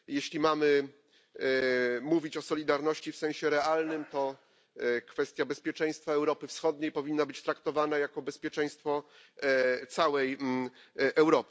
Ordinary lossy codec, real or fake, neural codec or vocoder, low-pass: none; real; none; none